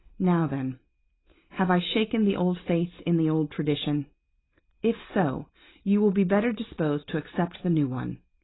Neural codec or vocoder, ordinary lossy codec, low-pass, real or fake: none; AAC, 16 kbps; 7.2 kHz; real